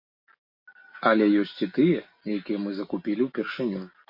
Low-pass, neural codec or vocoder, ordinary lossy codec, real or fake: 5.4 kHz; none; MP3, 32 kbps; real